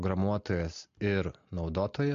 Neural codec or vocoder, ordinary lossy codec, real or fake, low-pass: none; MP3, 48 kbps; real; 7.2 kHz